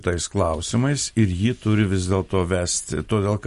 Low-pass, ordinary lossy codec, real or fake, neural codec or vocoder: 14.4 kHz; MP3, 48 kbps; real; none